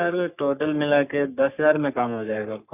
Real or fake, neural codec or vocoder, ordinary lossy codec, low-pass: fake; codec, 44.1 kHz, 3.4 kbps, Pupu-Codec; none; 3.6 kHz